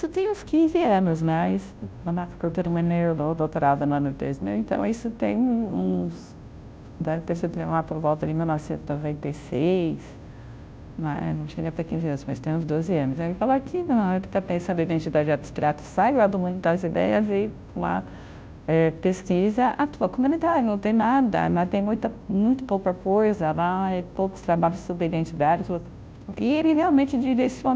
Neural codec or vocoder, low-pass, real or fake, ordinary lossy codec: codec, 16 kHz, 0.5 kbps, FunCodec, trained on Chinese and English, 25 frames a second; none; fake; none